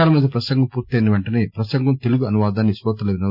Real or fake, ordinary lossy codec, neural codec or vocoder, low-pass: real; none; none; 5.4 kHz